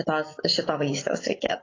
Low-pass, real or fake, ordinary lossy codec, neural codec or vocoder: 7.2 kHz; real; AAC, 32 kbps; none